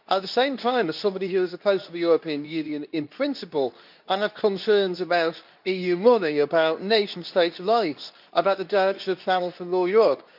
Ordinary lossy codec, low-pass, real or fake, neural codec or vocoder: none; 5.4 kHz; fake; codec, 24 kHz, 0.9 kbps, WavTokenizer, medium speech release version 2